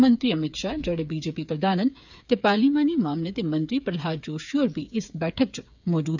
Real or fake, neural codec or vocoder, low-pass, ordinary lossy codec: fake; codec, 16 kHz, 8 kbps, FreqCodec, smaller model; 7.2 kHz; none